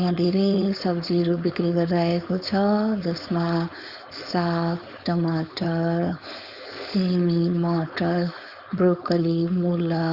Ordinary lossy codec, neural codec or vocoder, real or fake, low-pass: Opus, 64 kbps; codec, 16 kHz, 4.8 kbps, FACodec; fake; 5.4 kHz